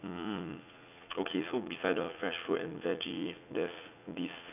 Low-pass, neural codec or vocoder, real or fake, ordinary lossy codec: 3.6 kHz; vocoder, 44.1 kHz, 80 mel bands, Vocos; fake; none